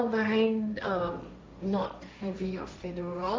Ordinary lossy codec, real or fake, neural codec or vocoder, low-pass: none; fake; codec, 16 kHz, 1.1 kbps, Voila-Tokenizer; none